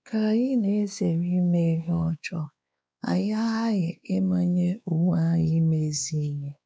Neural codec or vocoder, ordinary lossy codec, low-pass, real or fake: codec, 16 kHz, 2 kbps, X-Codec, WavLM features, trained on Multilingual LibriSpeech; none; none; fake